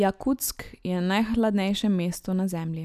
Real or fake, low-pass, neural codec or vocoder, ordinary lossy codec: real; 14.4 kHz; none; none